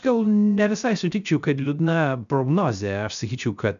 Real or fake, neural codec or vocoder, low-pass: fake; codec, 16 kHz, 0.3 kbps, FocalCodec; 7.2 kHz